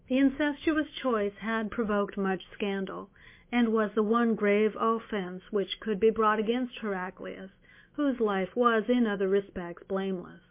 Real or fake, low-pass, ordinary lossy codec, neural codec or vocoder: fake; 3.6 kHz; MP3, 24 kbps; autoencoder, 48 kHz, 128 numbers a frame, DAC-VAE, trained on Japanese speech